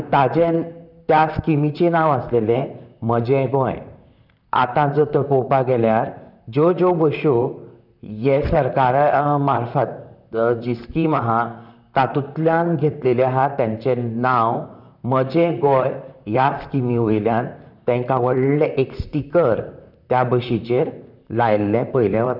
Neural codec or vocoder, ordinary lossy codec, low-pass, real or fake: vocoder, 44.1 kHz, 128 mel bands, Pupu-Vocoder; none; 5.4 kHz; fake